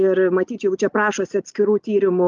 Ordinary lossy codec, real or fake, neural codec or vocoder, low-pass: Opus, 24 kbps; real; none; 7.2 kHz